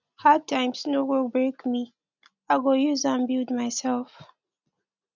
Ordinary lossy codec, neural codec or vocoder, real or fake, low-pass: none; none; real; 7.2 kHz